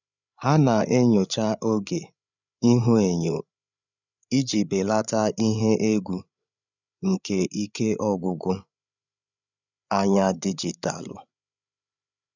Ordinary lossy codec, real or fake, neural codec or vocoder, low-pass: none; fake; codec, 16 kHz, 8 kbps, FreqCodec, larger model; 7.2 kHz